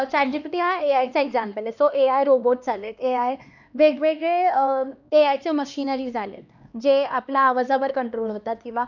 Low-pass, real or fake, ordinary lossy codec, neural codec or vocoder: 7.2 kHz; fake; none; codec, 16 kHz, 2 kbps, X-Codec, HuBERT features, trained on LibriSpeech